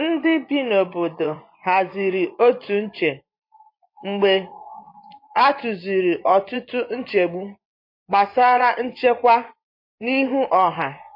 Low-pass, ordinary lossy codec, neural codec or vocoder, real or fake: 5.4 kHz; MP3, 32 kbps; vocoder, 24 kHz, 100 mel bands, Vocos; fake